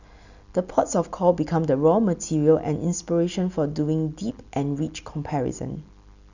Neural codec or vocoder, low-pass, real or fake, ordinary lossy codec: none; 7.2 kHz; real; none